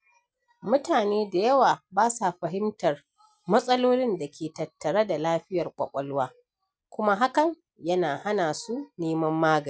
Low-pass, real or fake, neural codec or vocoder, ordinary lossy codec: none; real; none; none